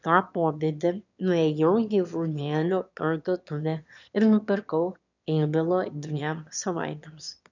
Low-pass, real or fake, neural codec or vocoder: 7.2 kHz; fake; autoencoder, 22.05 kHz, a latent of 192 numbers a frame, VITS, trained on one speaker